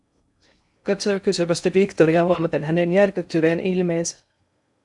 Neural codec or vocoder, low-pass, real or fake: codec, 16 kHz in and 24 kHz out, 0.6 kbps, FocalCodec, streaming, 2048 codes; 10.8 kHz; fake